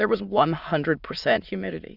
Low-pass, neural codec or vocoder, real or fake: 5.4 kHz; autoencoder, 22.05 kHz, a latent of 192 numbers a frame, VITS, trained on many speakers; fake